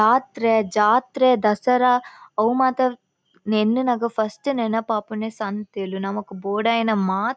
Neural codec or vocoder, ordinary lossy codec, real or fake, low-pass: none; none; real; none